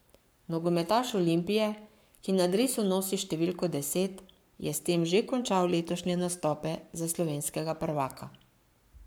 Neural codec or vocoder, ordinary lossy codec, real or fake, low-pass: codec, 44.1 kHz, 7.8 kbps, Pupu-Codec; none; fake; none